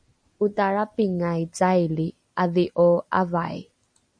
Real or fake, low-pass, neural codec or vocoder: real; 9.9 kHz; none